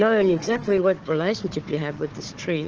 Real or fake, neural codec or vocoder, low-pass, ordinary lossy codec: fake; codec, 16 kHz, 4 kbps, FunCodec, trained on Chinese and English, 50 frames a second; 7.2 kHz; Opus, 16 kbps